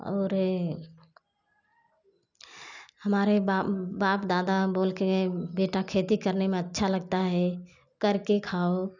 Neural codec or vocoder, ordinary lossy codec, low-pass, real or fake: none; none; 7.2 kHz; real